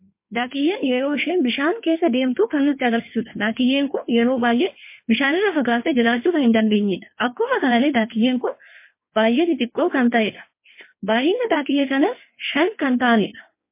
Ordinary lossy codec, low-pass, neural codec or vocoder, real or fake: MP3, 24 kbps; 3.6 kHz; codec, 16 kHz in and 24 kHz out, 1.1 kbps, FireRedTTS-2 codec; fake